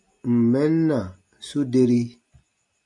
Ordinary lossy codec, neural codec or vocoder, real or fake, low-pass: MP3, 64 kbps; none; real; 10.8 kHz